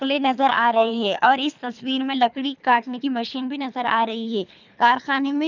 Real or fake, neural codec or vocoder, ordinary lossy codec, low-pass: fake; codec, 24 kHz, 3 kbps, HILCodec; none; 7.2 kHz